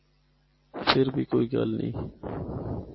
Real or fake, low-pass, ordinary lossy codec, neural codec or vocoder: real; 7.2 kHz; MP3, 24 kbps; none